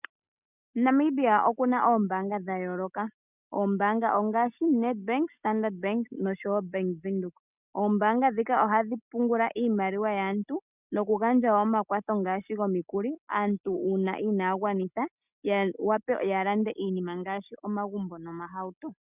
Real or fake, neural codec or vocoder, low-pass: real; none; 3.6 kHz